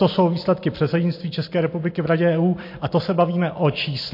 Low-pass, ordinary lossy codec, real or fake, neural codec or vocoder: 5.4 kHz; MP3, 32 kbps; real; none